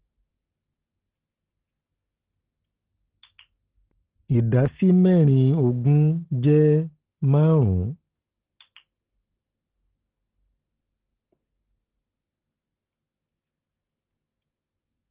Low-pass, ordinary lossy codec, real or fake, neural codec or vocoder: 3.6 kHz; Opus, 16 kbps; real; none